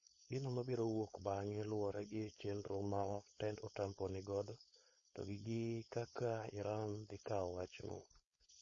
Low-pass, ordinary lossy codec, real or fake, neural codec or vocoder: 7.2 kHz; MP3, 32 kbps; fake; codec, 16 kHz, 4.8 kbps, FACodec